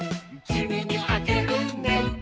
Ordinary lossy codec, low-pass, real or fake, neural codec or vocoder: none; none; fake; codec, 16 kHz, 4 kbps, X-Codec, HuBERT features, trained on balanced general audio